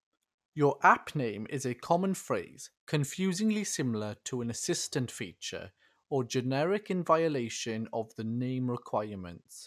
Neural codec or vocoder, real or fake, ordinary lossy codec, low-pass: none; real; none; 14.4 kHz